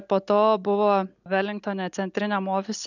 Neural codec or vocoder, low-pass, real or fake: none; 7.2 kHz; real